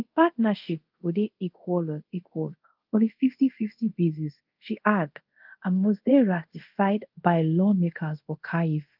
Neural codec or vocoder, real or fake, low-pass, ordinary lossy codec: codec, 24 kHz, 0.5 kbps, DualCodec; fake; 5.4 kHz; Opus, 24 kbps